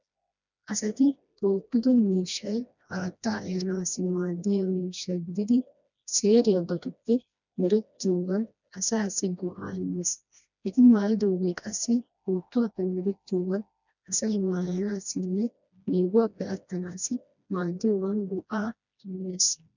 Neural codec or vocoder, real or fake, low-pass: codec, 16 kHz, 1 kbps, FreqCodec, smaller model; fake; 7.2 kHz